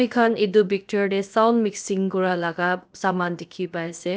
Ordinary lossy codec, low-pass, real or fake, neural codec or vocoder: none; none; fake; codec, 16 kHz, 0.7 kbps, FocalCodec